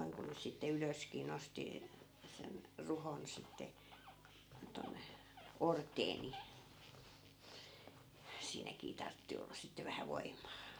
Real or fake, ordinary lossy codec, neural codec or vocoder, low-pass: real; none; none; none